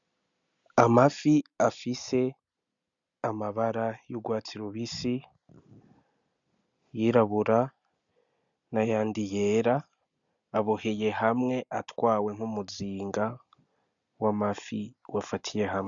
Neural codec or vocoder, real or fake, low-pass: none; real; 7.2 kHz